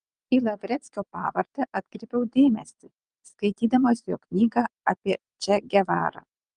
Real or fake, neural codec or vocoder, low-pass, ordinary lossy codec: fake; vocoder, 22.05 kHz, 80 mel bands, WaveNeXt; 9.9 kHz; Opus, 32 kbps